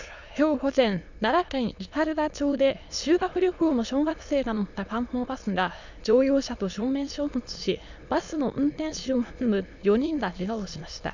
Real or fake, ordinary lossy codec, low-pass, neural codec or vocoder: fake; none; 7.2 kHz; autoencoder, 22.05 kHz, a latent of 192 numbers a frame, VITS, trained on many speakers